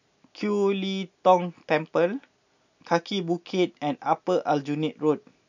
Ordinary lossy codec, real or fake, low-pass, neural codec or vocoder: none; real; 7.2 kHz; none